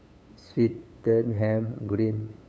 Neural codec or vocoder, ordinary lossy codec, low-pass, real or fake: codec, 16 kHz, 8 kbps, FunCodec, trained on LibriTTS, 25 frames a second; none; none; fake